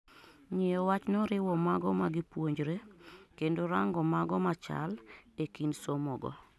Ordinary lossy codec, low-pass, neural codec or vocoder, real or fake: none; none; none; real